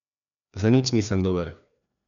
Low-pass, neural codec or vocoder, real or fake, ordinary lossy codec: 7.2 kHz; codec, 16 kHz, 2 kbps, FreqCodec, larger model; fake; none